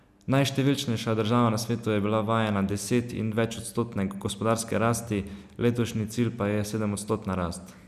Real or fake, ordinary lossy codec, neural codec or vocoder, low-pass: real; none; none; 14.4 kHz